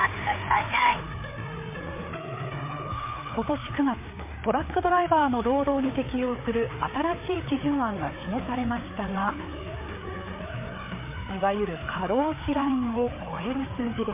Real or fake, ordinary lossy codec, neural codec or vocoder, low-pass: fake; MP3, 24 kbps; codec, 16 kHz, 4 kbps, FreqCodec, larger model; 3.6 kHz